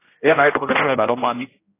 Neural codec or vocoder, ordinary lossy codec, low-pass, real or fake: codec, 16 kHz, 0.5 kbps, X-Codec, HuBERT features, trained on general audio; AAC, 24 kbps; 3.6 kHz; fake